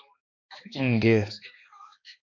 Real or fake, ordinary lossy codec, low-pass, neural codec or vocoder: fake; MP3, 48 kbps; 7.2 kHz; codec, 16 kHz, 2 kbps, X-Codec, HuBERT features, trained on balanced general audio